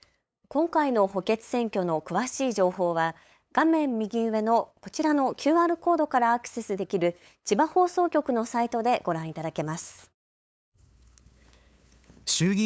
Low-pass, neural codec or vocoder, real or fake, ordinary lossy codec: none; codec, 16 kHz, 8 kbps, FunCodec, trained on LibriTTS, 25 frames a second; fake; none